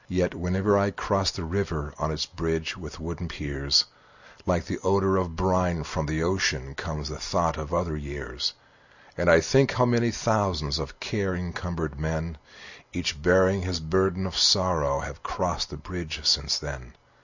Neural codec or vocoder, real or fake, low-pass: none; real; 7.2 kHz